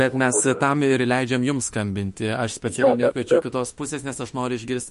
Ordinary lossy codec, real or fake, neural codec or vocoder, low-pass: MP3, 48 kbps; fake; autoencoder, 48 kHz, 32 numbers a frame, DAC-VAE, trained on Japanese speech; 14.4 kHz